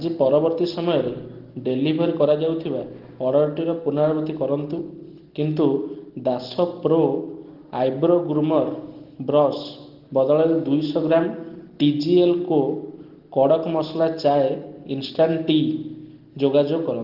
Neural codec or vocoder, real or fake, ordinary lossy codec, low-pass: none; real; Opus, 16 kbps; 5.4 kHz